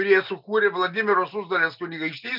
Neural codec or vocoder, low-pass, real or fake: none; 5.4 kHz; real